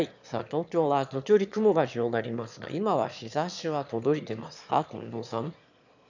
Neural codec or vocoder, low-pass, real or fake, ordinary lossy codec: autoencoder, 22.05 kHz, a latent of 192 numbers a frame, VITS, trained on one speaker; 7.2 kHz; fake; none